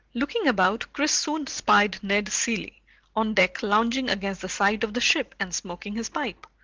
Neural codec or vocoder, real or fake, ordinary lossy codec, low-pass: none; real; Opus, 16 kbps; 7.2 kHz